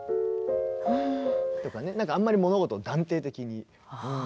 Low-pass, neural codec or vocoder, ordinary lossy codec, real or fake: none; none; none; real